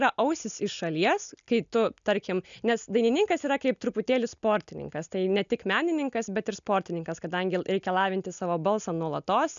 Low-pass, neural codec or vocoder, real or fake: 7.2 kHz; none; real